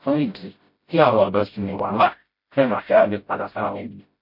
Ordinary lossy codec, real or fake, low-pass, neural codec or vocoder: MP3, 32 kbps; fake; 5.4 kHz; codec, 16 kHz, 0.5 kbps, FreqCodec, smaller model